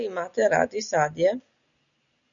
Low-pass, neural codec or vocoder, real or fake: 7.2 kHz; none; real